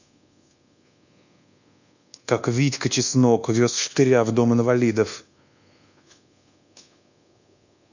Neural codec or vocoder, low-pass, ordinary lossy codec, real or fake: codec, 24 kHz, 1.2 kbps, DualCodec; 7.2 kHz; none; fake